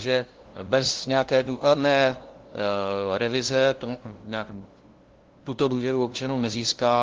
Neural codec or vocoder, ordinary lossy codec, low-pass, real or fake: codec, 16 kHz, 0.5 kbps, FunCodec, trained on LibriTTS, 25 frames a second; Opus, 16 kbps; 7.2 kHz; fake